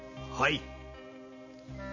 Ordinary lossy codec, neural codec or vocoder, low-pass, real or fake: MP3, 32 kbps; none; 7.2 kHz; real